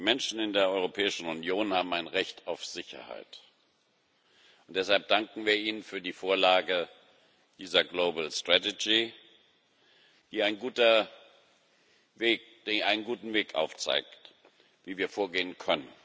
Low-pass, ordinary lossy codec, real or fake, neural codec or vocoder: none; none; real; none